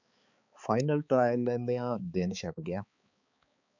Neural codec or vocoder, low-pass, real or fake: codec, 16 kHz, 4 kbps, X-Codec, HuBERT features, trained on balanced general audio; 7.2 kHz; fake